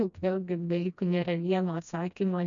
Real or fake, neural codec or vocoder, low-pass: fake; codec, 16 kHz, 1 kbps, FreqCodec, smaller model; 7.2 kHz